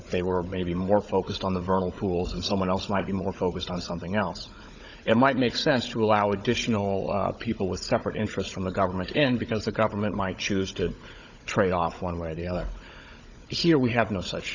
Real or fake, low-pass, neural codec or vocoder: fake; 7.2 kHz; codec, 16 kHz, 16 kbps, FunCodec, trained on Chinese and English, 50 frames a second